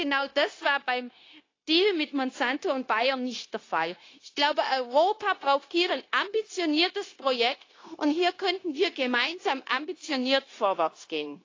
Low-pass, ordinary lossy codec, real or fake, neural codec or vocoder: 7.2 kHz; AAC, 32 kbps; fake; codec, 16 kHz, 0.9 kbps, LongCat-Audio-Codec